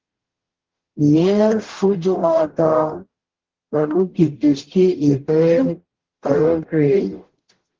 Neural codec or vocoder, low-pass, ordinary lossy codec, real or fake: codec, 44.1 kHz, 0.9 kbps, DAC; 7.2 kHz; Opus, 16 kbps; fake